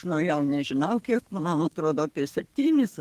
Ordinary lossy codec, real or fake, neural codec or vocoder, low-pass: Opus, 24 kbps; fake; codec, 32 kHz, 1.9 kbps, SNAC; 14.4 kHz